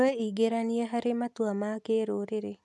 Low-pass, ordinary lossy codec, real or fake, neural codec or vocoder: none; none; real; none